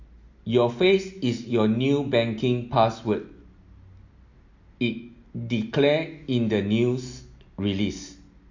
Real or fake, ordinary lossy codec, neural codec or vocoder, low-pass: real; MP3, 48 kbps; none; 7.2 kHz